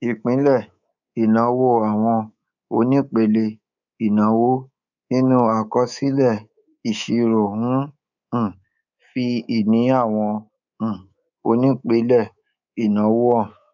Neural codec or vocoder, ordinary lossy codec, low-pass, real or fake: codec, 24 kHz, 3.1 kbps, DualCodec; none; 7.2 kHz; fake